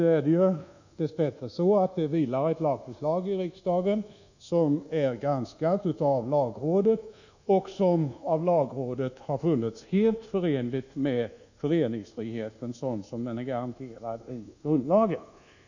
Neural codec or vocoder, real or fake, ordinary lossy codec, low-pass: codec, 24 kHz, 1.2 kbps, DualCodec; fake; none; 7.2 kHz